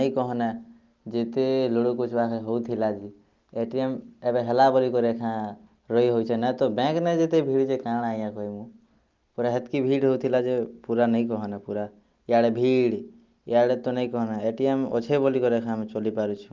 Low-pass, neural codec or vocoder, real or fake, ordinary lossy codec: 7.2 kHz; none; real; Opus, 32 kbps